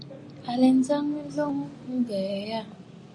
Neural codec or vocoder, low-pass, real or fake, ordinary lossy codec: none; 10.8 kHz; real; AAC, 48 kbps